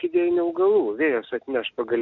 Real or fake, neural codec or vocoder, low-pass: real; none; 7.2 kHz